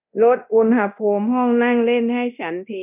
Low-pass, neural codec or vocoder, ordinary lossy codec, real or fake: 3.6 kHz; codec, 24 kHz, 0.9 kbps, DualCodec; none; fake